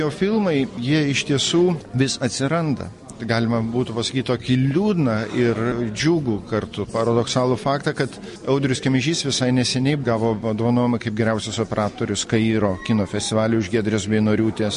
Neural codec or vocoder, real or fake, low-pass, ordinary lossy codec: none; real; 14.4 kHz; MP3, 48 kbps